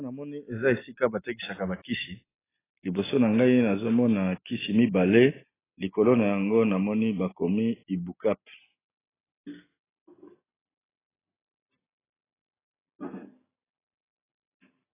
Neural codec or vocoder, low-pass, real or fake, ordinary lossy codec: none; 3.6 kHz; real; AAC, 16 kbps